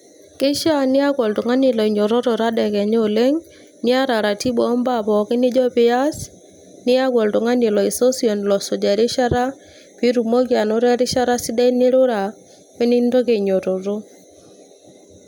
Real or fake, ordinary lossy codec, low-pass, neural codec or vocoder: real; none; 19.8 kHz; none